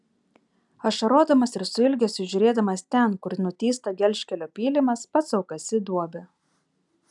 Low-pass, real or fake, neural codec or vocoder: 10.8 kHz; real; none